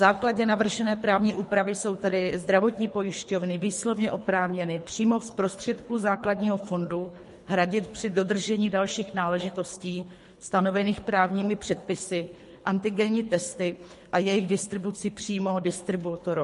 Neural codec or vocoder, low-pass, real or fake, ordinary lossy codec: codec, 24 kHz, 3 kbps, HILCodec; 10.8 kHz; fake; MP3, 48 kbps